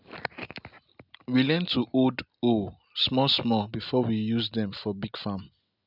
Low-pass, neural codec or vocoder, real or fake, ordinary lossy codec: 5.4 kHz; none; real; none